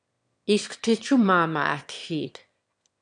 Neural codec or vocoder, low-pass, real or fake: autoencoder, 22.05 kHz, a latent of 192 numbers a frame, VITS, trained on one speaker; 9.9 kHz; fake